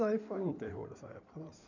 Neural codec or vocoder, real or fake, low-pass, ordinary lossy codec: codec, 16 kHz in and 24 kHz out, 2.2 kbps, FireRedTTS-2 codec; fake; 7.2 kHz; none